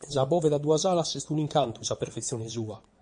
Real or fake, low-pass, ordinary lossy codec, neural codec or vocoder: fake; 9.9 kHz; MP3, 48 kbps; vocoder, 22.05 kHz, 80 mel bands, WaveNeXt